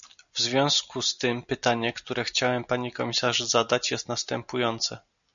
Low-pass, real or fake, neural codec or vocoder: 7.2 kHz; real; none